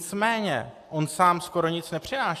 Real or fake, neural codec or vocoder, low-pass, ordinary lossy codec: real; none; 14.4 kHz; AAC, 64 kbps